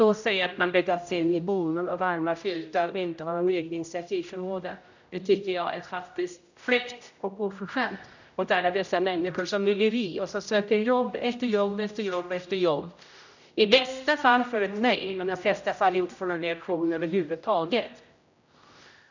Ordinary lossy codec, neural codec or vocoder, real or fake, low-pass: none; codec, 16 kHz, 0.5 kbps, X-Codec, HuBERT features, trained on general audio; fake; 7.2 kHz